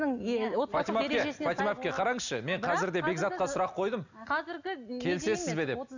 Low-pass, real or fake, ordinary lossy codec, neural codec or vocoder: 7.2 kHz; real; none; none